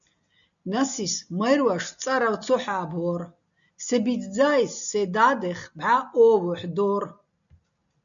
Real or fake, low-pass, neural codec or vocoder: real; 7.2 kHz; none